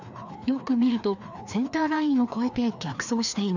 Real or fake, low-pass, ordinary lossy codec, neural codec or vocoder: fake; 7.2 kHz; none; codec, 16 kHz, 2 kbps, FreqCodec, larger model